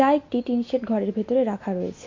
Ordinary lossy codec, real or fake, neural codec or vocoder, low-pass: MP3, 48 kbps; real; none; 7.2 kHz